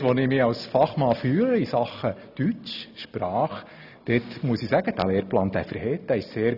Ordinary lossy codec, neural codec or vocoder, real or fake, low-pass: none; none; real; 5.4 kHz